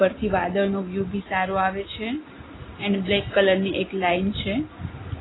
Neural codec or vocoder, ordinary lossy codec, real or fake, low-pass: none; AAC, 16 kbps; real; 7.2 kHz